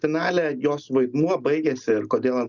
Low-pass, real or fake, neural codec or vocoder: 7.2 kHz; real; none